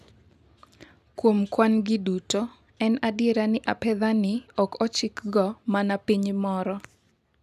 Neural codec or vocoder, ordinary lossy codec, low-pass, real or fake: none; none; 14.4 kHz; real